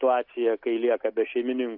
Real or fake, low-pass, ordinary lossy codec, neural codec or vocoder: real; 5.4 kHz; AAC, 48 kbps; none